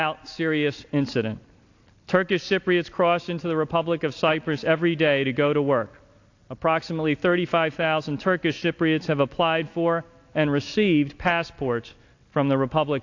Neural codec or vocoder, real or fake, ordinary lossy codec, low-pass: none; real; AAC, 48 kbps; 7.2 kHz